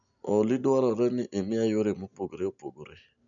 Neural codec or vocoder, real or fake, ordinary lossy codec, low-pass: none; real; none; 7.2 kHz